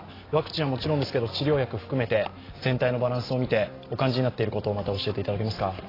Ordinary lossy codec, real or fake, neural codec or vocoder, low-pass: AAC, 24 kbps; real; none; 5.4 kHz